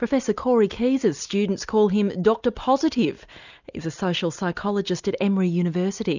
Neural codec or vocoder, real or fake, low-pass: none; real; 7.2 kHz